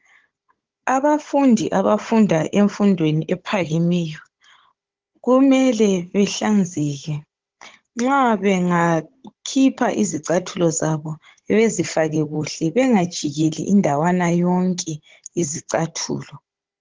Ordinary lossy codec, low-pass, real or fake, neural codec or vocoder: Opus, 16 kbps; 7.2 kHz; fake; codec, 16 kHz, 16 kbps, FunCodec, trained on Chinese and English, 50 frames a second